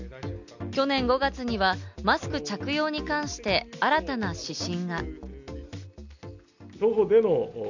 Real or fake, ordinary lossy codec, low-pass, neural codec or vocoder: real; none; 7.2 kHz; none